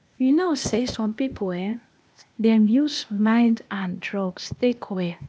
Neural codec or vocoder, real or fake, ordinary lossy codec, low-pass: codec, 16 kHz, 0.8 kbps, ZipCodec; fake; none; none